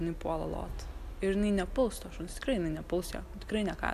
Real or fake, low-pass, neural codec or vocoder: real; 14.4 kHz; none